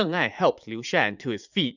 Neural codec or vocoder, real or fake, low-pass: vocoder, 44.1 kHz, 80 mel bands, Vocos; fake; 7.2 kHz